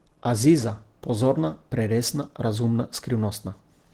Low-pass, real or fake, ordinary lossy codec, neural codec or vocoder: 19.8 kHz; real; Opus, 16 kbps; none